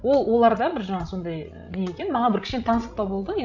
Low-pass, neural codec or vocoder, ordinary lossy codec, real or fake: 7.2 kHz; codec, 16 kHz, 16 kbps, FreqCodec, larger model; none; fake